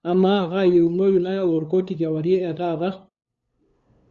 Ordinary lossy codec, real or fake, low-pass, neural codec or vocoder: Opus, 64 kbps; fake; 7.2 kHz; codec, 16 kHz, 2 kbps, FunCodec, trained on LibriTTS, 25 frames a second